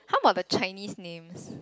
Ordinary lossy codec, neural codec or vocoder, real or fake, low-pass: none; none; real; none